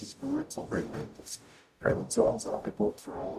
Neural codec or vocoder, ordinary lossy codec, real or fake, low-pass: codec, 44.1 kHz, 0.9 kbps, DAC; none; fake; 14.4 kHz